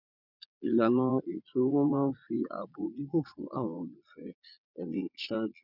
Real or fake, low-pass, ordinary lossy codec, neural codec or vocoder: fake; 5.4 kHz; none; vocoder, 22.05 kHz, 80 mel bands, Vocos